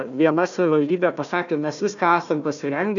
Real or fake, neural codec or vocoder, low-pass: fake; codec, 16 kHz, 1 kbps, FunCodec, trained on Chinese and English, 50 frames a second; 7.2 kHz